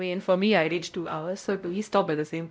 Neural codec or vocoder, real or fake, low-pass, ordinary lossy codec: codec, 16 kHz, 0.5 kbps, X-Codec, WavLM features, trained on Multilingual LibriSpeech; fake; none; none